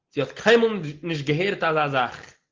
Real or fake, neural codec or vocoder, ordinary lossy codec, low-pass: real; none; Opus, 16 kbps; 7.2 kHz